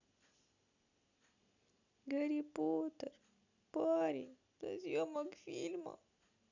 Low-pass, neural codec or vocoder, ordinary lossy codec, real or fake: 7.2 kHz; none; none; real